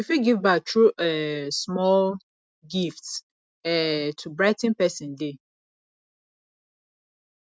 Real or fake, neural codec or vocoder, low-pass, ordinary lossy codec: real; none; none; none